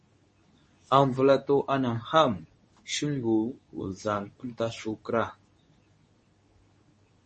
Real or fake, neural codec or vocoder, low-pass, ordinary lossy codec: fake; codec, 24 kHz, 0.9 kbps, WavTokenizer, medium speech release version 2; 10.8 kHz; MP3, 32 kbps